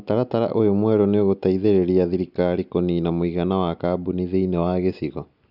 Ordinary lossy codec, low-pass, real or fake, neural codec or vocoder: none; 5.4 kHz; real; none